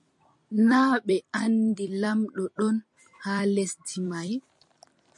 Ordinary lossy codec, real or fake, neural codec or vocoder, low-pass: MP3, 64 kbps; fake; vocoder, 44.1 kHz, 128 mel bands every 256 samples, BigVGAN v2; 10.8 kHz